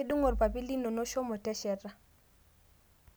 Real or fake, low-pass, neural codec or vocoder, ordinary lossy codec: real; none; none; none